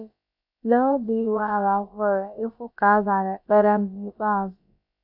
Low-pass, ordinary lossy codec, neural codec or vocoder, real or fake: 5.4 kHz; none; codec, 16 kHz, about 1 kbps, DyCAST, with the encoder's durations; fake